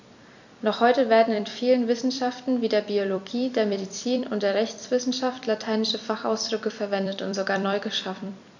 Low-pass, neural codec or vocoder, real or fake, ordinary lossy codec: 7.2 kHz; vocoder, 44.1 kHz, 128 mel bands every 256 samples, BigVGAN v2; fake; none